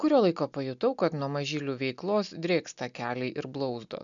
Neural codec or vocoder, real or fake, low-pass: none; real; 7.2 kHz